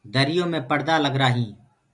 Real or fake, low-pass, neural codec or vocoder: real; 10.8 kHz; none